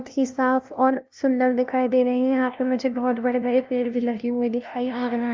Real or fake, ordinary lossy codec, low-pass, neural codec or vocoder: fake; Opus, 24 kbps; 7.2 kHz; codec, 16 kHz, 0.5 kbps, FunCodec, trained on LibriTTS, 25 frames a second